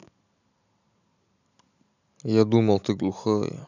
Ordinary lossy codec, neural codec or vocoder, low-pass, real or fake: none; none; 7.2 kHz; real